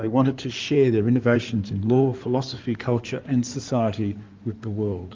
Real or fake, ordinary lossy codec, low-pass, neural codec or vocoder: fake; Opus, 24 kbps; 7.2 kHz; codec, 16 kHz in and 24 kHz out, 2.2 kbps, FireRedTTS-2 codec